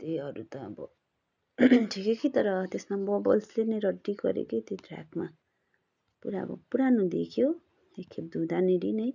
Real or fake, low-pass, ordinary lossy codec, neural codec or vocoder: real; 7.2 kHz; none; none